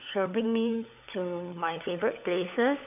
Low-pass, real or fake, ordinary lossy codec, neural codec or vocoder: 3.6 kHz; fake; none; codec, 16 kHz, 16 kbps, FunCodec, trained on LibriTTS, 50 frames a second